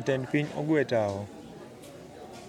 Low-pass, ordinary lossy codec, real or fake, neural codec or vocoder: 19.8 kHz; MP3, 96 kbps; real; none